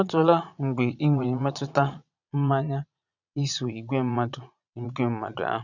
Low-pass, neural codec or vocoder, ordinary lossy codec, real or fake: 7.2 kHz; vocoder, 22.05 kHz, 80 mel bands, Vocos; none; fake